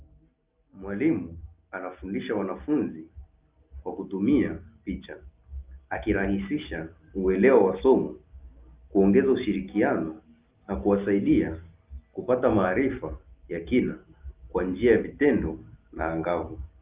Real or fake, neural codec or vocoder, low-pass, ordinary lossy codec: real; none; 3.6 kHz; Opus, 32 kbps